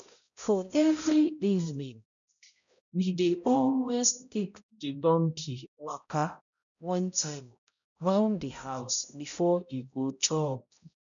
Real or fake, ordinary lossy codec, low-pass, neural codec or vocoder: fake; none; 7.2 kHz; codec, 16 kHz, 0.5 kbps, X-Codec, HuBERT features, trained on balanced general audio